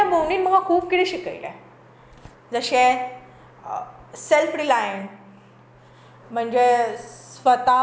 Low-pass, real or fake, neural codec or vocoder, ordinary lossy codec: none; real; none; none